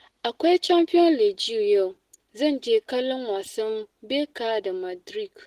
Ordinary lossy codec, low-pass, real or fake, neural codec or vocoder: Opus, 16 kbps; 14.4 kHz; real; none